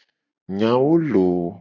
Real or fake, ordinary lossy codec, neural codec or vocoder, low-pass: real; AAC, 48 kbps; none; 7.2 kHz